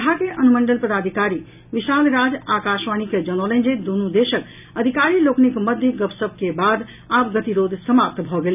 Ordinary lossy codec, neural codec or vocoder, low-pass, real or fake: none; none; 3.6 kHz; real